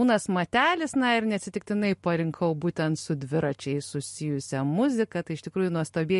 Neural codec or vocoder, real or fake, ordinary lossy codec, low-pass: none; real; MP3, 48 kbps; 14.4 kHz